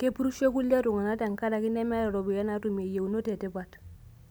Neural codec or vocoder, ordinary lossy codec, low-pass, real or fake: none; none; none; real